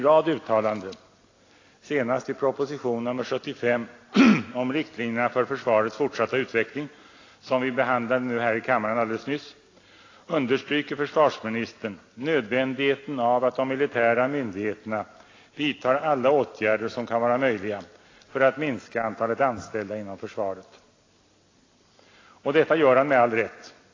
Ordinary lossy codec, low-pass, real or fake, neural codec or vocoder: AAC, 32 kbps; 7.2 kHz; real; none